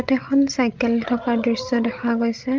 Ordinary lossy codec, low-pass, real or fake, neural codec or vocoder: Opus, 24 kbps; 7.2 kHz; fake; codec, 16 kHz, 16 kbps, FreqCodec, larger model